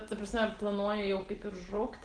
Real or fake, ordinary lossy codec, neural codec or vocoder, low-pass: real; Opus, 16 kbps; none; 9.9 kHz